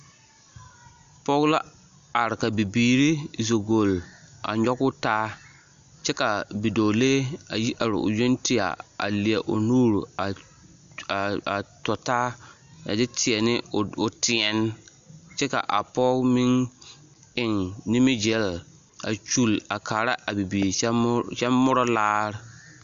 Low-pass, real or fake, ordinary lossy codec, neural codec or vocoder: 7.2 kHz; real; MP3, 64 kbps; none